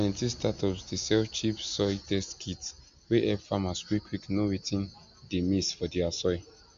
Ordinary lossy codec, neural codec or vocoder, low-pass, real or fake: MP3, 64 kbps; none; 7.2 kHz; real